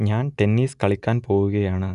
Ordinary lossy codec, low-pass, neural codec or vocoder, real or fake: none; 10.8 kHz; none; real